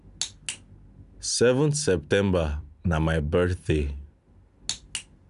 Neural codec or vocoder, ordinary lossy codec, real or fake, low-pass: none; none; real; 10.8 kHz